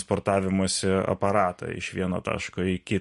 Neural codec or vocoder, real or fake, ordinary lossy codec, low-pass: vocoder, 44.1 kHz, 128 mel bands every 256 samples, BigVGAN v2; fake; MP3, 48 kbps; 14.4 kHz